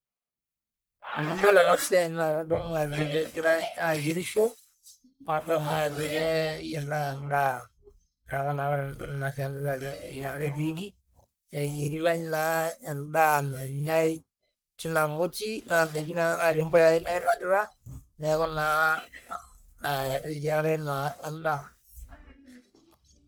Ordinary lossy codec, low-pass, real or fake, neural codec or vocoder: none; none; fake; codec, 44.1 kHz, 1.7 kbps, Pupu-Codec